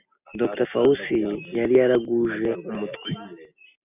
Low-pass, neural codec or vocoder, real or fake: 3.6 kHz; none; real